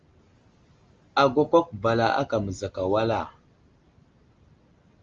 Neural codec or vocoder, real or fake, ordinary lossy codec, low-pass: none; real; Opus, 32 kbps; 7.2 kHz